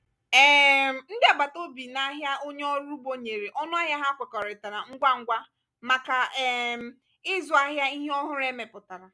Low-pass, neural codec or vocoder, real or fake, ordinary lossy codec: none; none; real; none